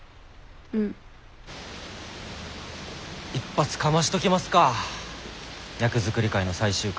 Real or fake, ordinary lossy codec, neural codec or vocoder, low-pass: real; none; none; none